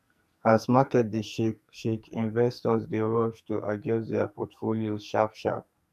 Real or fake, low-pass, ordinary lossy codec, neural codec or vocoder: fake; 14.4 kHz; none; codec, 44.1 kHz, 2.6 kbps, SNAC